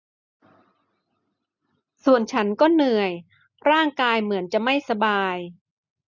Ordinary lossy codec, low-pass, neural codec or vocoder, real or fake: none; 7.2 kHz; none; real